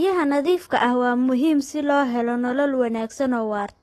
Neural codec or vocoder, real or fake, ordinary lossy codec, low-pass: autoencoder, 48 kHz, 128 numbers a frame, DAC-VAE, trained on Japanese speech; fake; AAC, 32 kbps; 19.8 kHz